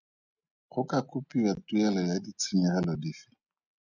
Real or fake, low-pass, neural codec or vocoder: real; 7.2 kHz; none